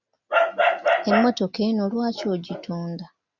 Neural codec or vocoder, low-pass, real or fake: none; 7.2 kHz; real